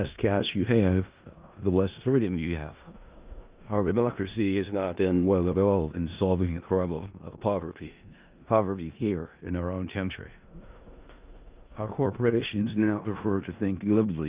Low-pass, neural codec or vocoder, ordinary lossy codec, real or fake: 3.6 kHz; codec, 16 kHz in and 24 kHz out, 0.4 kbps, LongCat-Audio-Codec, four codebook decoder; Opus, 24 kbps; fake